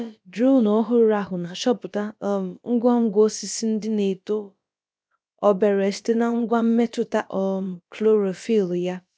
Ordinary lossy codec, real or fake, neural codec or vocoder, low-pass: none; fake; codec, 16 kHz, about 1 kbps, DyCAST, with the encoder's durations; none